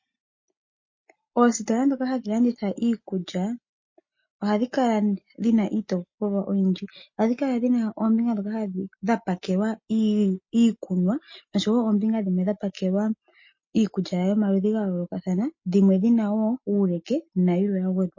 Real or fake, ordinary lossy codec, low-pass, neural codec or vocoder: real; MP3, 32 kbps; 7.2 kHz; none